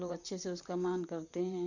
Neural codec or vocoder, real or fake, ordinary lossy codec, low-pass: vocoder, 44.1 kHz, 128 mel bands, Pupu-Vocoder; fake; none; 7.2 kHz